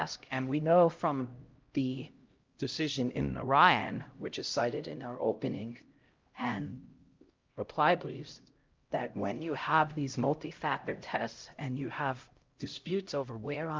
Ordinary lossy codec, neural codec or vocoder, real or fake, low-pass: Opus, 32 kbps; codec, 16 kHz, 0.5 kbps, X-Codec, HuBERT features, trained on LibriSpeech; fake; 7.2 kHz